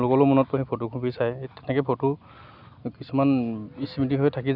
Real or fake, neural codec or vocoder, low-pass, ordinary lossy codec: real; none; 5.4 kHz; none